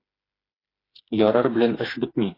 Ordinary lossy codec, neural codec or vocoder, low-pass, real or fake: AAC, 24 kbps; codec, 16 kHz, 4 kbps, FreqCodec, smaller model; 5.4 kHz; fake